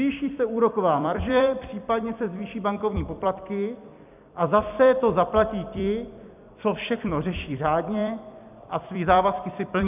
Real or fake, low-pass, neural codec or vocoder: fake; 3.6 kHz; vocoder, 44.1 kHz, 128 mel bands every 256 samples, BigVGAN v2